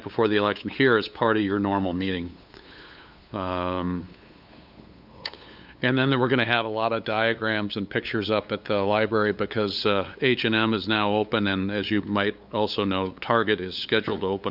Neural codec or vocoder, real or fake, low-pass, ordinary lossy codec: codec, 16 kHz, 8 kbps, FunCodec, trained on LibriTTS, 25 frames a second; fake; 5.4 kHz; Opus, 64 kbps